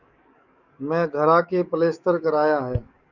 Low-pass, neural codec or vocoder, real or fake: 7.2 kHz; codec, 44.1 kHz, 7.8 kbps, DAC; fake